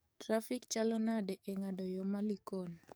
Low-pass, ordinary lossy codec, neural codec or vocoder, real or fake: none; none; codec, 44.1 kHz, 7.8 kbps, DAC; fake